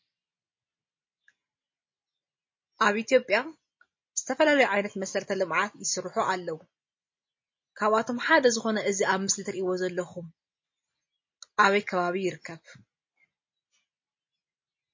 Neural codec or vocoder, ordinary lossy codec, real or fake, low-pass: none; MP3, 32 kbps; real; 7.2 kHz